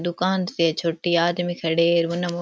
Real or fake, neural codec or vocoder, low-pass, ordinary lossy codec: real; none; none; none